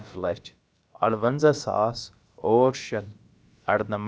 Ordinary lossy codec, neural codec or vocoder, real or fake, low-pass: none; codec, 16 kHz, about 1 kbps, DyCAST, with the encoder's durations; fake; none